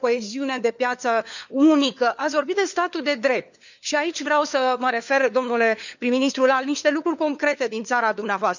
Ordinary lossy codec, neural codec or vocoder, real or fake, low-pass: none; codec, 16 kHz, 4 kbps, FunCodec, trained on LibriTTS, 50 frames a second; fake; 7.2 kHz